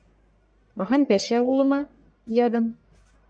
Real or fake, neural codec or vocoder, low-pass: fake; codec, 44.1 kHz, 1.7 kbps, Pupu-Codec; 9.9 kHz